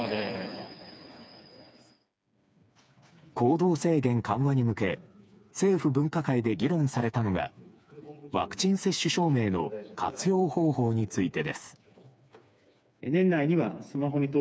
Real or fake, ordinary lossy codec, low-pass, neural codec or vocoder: fake; none; none; codec, 16 kHz, 4 kbps, FreqCodec, smaller model